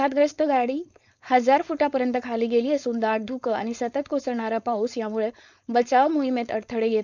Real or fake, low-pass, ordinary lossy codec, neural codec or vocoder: fake; 7.2 kHz; none; codec, 16 kHz, 4.8 kbps, FACodec